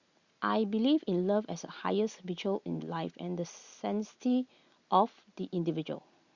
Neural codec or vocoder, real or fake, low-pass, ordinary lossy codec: none; real; 7.2 kHz; Opus, 64 kbps